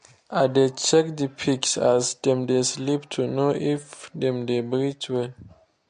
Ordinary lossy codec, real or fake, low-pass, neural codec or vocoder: MP3, 48 kbps; real; 9.9 kHz; none